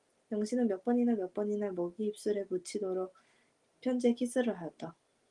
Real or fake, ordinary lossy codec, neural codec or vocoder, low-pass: real; Opus, 24 kbps; none; 10.8 kHz